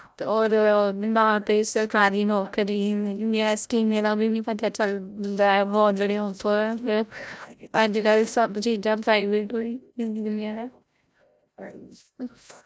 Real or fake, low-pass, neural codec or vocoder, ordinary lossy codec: fake; none; codec, 16 kHz, 0.5 kbps, FreqCodec, larger model; none